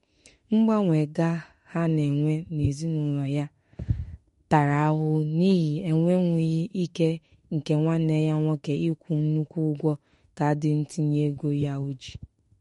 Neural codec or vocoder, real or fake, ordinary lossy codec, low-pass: autoencoder, 48 kHz, 32 numbers a frame, DAC-VAE, trained on Japanese speech; fake; MP3, 48 kbps; 19.8 kHz